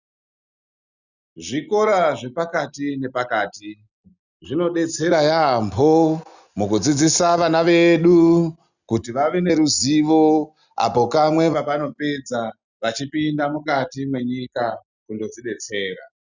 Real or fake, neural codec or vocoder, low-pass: real; none; 7.2 kHz